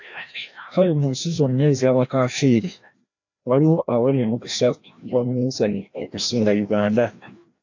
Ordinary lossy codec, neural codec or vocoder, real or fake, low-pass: AAC, 48 kbps; codec, 16 kHz, 1 kbps, FreqCodec, larger model; fake; 7.2 kHz